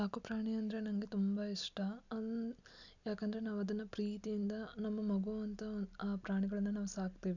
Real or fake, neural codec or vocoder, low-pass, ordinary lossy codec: real; none; 7.2 kHz; none